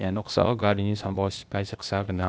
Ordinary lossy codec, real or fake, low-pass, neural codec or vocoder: none; fake; none; codec, 16 kHz, 0.8 kbps, ZipCodec